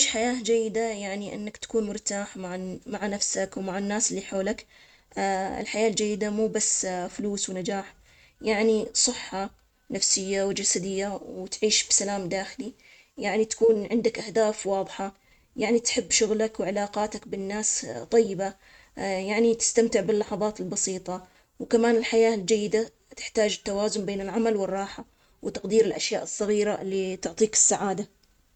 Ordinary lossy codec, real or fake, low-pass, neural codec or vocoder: none; real; 19.8 kHz; none